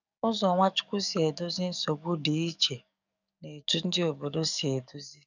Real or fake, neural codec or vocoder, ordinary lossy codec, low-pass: fake; codec, 44.1 kHz, 7.8 kbps, DAC; none; 7.2 kHz